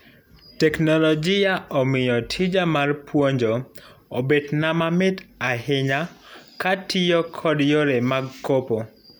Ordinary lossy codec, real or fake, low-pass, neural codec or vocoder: none; real; none; none